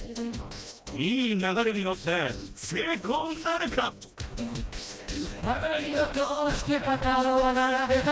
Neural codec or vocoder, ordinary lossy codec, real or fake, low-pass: codec, 16 kHz, 1 kbps, FreqCodec, smaller model; none; fake; none